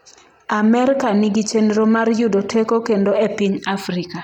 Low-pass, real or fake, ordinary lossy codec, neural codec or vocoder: 19.8 kHz; real; none; none